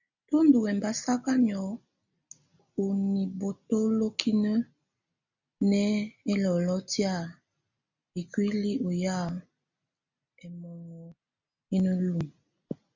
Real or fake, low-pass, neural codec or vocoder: real; 7.2 kHz; none